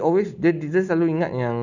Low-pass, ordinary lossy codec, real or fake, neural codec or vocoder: 7.2 kHz; none; real; none